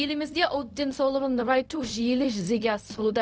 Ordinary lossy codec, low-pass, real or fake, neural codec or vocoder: none; none; fake; codec, 16 kHz, 0.4 kbps, LongCat-Audio-Codec